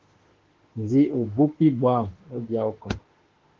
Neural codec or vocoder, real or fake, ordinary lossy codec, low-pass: autoencoder, 48 kHz, 32 numbers a frame, DAC-VAE, trained on Japanese speech; fake; Opus, 16 kbps; 7.2 kHz